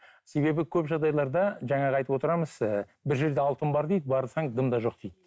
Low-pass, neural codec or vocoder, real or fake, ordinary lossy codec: none; none; real; none